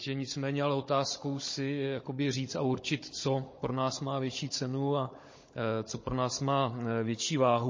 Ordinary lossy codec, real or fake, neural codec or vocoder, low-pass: MP3, 32 kbps; fake; codec, 16 kHz, 16 kbps, FunCodec, trained on Chinese and English, 50 frames a second; 7.2 kHz